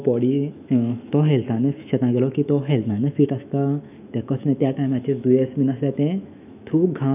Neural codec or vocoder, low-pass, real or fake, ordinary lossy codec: autoencoder, 48 kHz, 128 numbers a frame, DAC-VAE, trained on Japanese speech; 3.6 kHz; fake; none